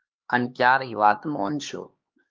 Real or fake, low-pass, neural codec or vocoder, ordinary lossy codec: fake; 7.2 kHz; codec, 16 kHz, 2 kbps, X-Codec, HuBERT features, trained on LibriSpeech; Opus, 24 kbps